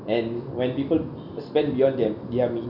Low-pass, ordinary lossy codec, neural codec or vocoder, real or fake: 5.4 kHz; none; none; real